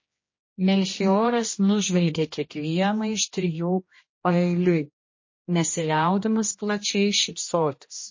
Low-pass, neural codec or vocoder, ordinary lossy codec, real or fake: 7.2 kHz; codec, 16 kHz, 1 kbps, X-Codec, HuBERT features, trained on general audio; MP3, 32 kbps; fake